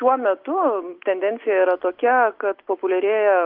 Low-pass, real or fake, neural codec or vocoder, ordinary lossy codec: 5.4 kHz; real; none; Opus, 24 kbps